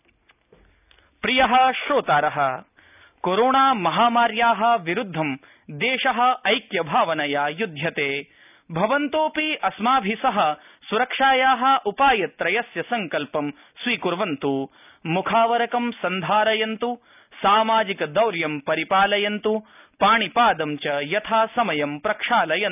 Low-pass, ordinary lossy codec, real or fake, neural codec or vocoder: 3.6 kHz; none; real; none